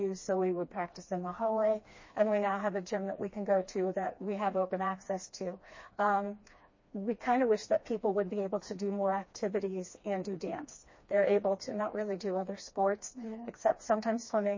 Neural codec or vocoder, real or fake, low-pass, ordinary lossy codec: codec, 16 kHz, 2 kbps, FreqCodec, smaller model; fake; 7.2 kHz; MP3, 32 kbps